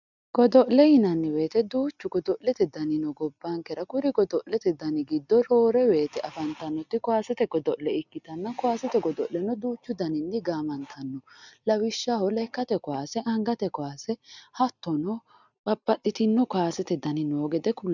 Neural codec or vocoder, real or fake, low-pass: none; real; 7.2 kHz